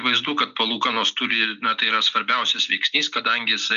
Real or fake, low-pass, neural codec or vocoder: real; 7.2 kHz; none